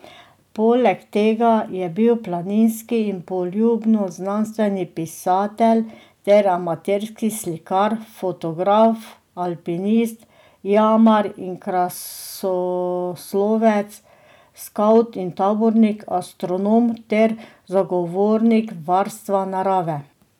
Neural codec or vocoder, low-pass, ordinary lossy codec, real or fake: none; 19.8 kHz; none; real